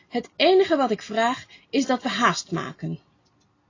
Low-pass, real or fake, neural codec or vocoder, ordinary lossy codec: 7.2 kHz; real; none; AAC, 32 kbps